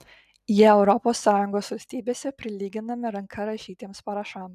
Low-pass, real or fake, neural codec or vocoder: 14.4 kHz; real; none